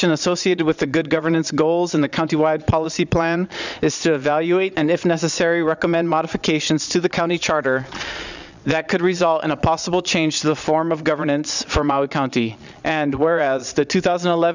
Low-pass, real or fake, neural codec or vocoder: 7.2 kHz; fake; vocoder, 22.05 kHz, 80 mel bands, Vocos